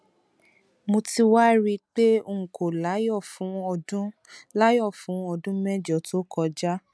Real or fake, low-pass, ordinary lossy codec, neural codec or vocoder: real; none; none; none